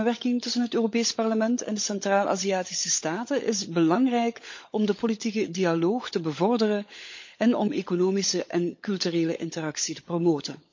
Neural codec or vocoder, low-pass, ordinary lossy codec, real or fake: codec, 16 kHz, 16 kbps, FunCodec, trained on LibriTTS, 50 frames a second; 7.2 kHz; MP3, 48 kbps; fake